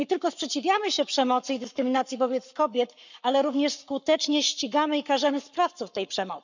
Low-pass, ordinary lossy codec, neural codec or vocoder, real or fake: 7.2 kHz; none; codec, 44.1 kHz, 7.8 kbps, Pupu-Codec; fake